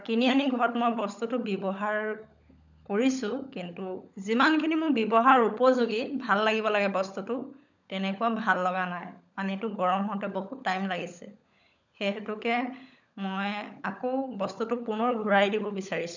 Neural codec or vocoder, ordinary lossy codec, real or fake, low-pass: codec, 16 kHz, 16 kbps, FunCodec, trained on LibriTTS, 50 frames a second; none; fake; 7.2 kHz